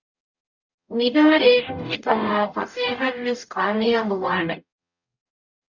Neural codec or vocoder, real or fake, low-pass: codec, 44.1 kHz, 0.9 kbps, DAC; fake; 7.2 kHz